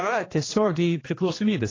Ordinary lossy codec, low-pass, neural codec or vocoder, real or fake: AAC, 32 kbps; 7.2 kHz; codec, 16 kHz, 1 kbps, X-Codec, HuBERT features, trained on general audio; fake